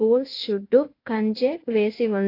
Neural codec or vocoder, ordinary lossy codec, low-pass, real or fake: codec, 16 kHz in and 24 kHz out, 1 kbps, XY-Tokenizer; AAC, 32 kbps; 5.4 kHz; fake